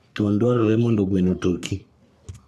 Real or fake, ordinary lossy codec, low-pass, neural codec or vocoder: fake; none; 14.4 kHz; codec, 44.1 kHz, 3.4 kbps, Pupu-Codec